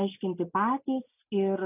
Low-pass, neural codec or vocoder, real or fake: 3.6 kHz; none; real